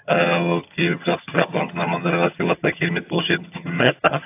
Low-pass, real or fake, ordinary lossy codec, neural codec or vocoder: 3.6 kHz; fake; AAC, 32 kbps; vocoder, 22.05 kHz, 80 mel bands, HiFi-GAN